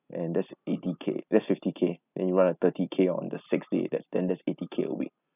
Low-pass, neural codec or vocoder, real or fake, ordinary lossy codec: 3.6 kHz; none; real; none